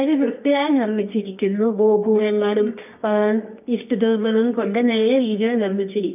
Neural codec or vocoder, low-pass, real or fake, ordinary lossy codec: codec, 24 kHz, 0.9 kbps, WavTokenizer, medium music audio release; 3.6 kHz; fake; none